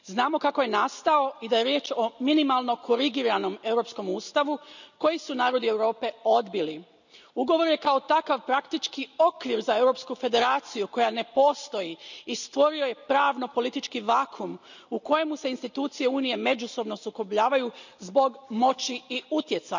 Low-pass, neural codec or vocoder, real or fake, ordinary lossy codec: 7.2 kHz; none; real; none